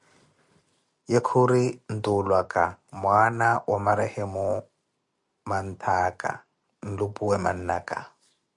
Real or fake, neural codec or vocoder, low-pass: real; none; 10.8 kHz